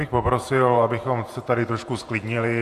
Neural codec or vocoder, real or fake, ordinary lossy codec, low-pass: vocoder, 48 kHz, 128 mel bands, Vocos; fake; AAC, 64 kbps; 14.4 kHz